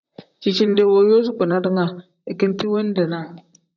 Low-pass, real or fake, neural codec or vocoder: 7.2 kHz; fake; vocoder, 44.1 kHz, 128 mel bands, Pupu-Vocoder